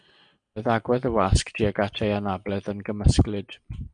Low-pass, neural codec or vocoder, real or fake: 9.9 kHz; none; real